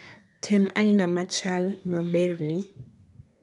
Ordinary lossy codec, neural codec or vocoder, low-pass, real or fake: none; codec, 24 kHz, 1 kbps, SNAC; 10.8 kHz; fake